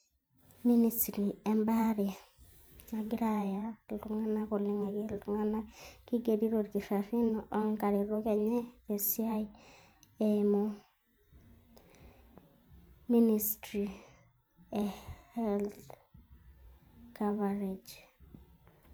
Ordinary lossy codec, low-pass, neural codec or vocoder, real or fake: none; none; vocoder, 44.1 kHz, 128 mel bands every 512 samples, BigVGAN v2; fake